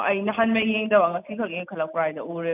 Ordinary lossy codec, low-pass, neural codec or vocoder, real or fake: none; 3.6 kHz; none; real